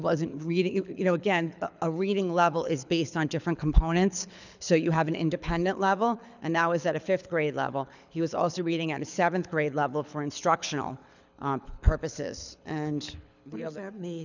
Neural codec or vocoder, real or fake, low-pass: codec, 24 kHz, 6 kbps, HILCodec; fake; 7.2 kHz